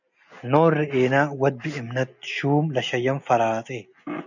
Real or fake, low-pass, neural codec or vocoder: real; 7.2 kHz; none